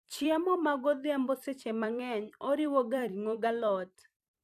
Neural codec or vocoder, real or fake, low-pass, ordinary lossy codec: vocoder, 44.1 kHz, 128 mel bands every 256 samples, BigVGAN v2; fake; 14.4 kHz; none